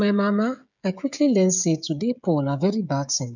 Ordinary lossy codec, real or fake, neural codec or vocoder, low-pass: none; fake; codec, 16 kHz, 16 kbps, FreqCodec, smaller model; 7.2 kHz